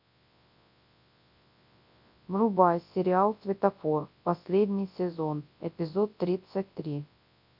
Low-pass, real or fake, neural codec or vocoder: 5.4 kHz; fake; codec, 24 kHz, 0.9 kbps, WavTokenizer, large speech release